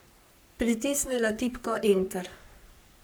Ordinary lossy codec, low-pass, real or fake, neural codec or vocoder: none; none; fake; codec, 44.1 kHz, 3.4 kbps, Pupu-Codec